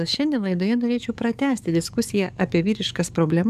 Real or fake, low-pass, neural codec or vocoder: fake; 14.4 kHz; codec, 44.1 kHz, 7.8 kbps, DAC